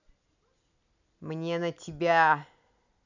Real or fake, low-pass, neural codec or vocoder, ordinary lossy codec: real; 7.2 kHz; none; none